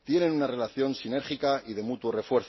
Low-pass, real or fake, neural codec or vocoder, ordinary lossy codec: 7.2 kHz; real; none; MP3, 24 kbps